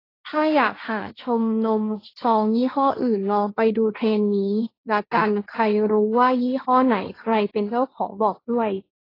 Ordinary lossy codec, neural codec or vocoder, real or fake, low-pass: AAC, 24 kbps; codec, 16 kHz, 1.1 kbps, Voila-Tokenizer; fake; 5.4 kHz